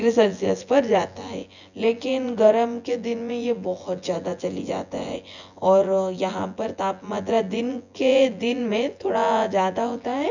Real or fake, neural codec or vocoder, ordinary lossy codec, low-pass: fake; vocoder, 24 kHz, 100 mel bands, Vocos; none; 7.2 kHz